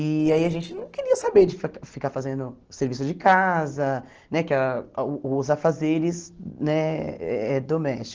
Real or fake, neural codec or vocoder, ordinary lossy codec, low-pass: real; none; Opus, 16 kbps; 7.2 kHz